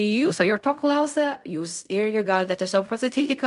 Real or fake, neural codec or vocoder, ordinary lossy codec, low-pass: fake; codec, 16 kHz in and 24 kHz out, 0.4 kbps, LongCat-Audio-Codec, fine tuned four codebook decoder; MP3, 96 kbps; 10.8 kHz